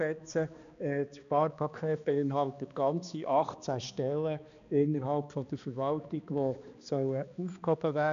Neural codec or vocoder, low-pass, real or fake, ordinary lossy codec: codec, 16 kHz, 2 kbps, X-Codec, HuBERT features, trained on balanced general audio; 7.2 kHz; fake; AAC, 96 kbps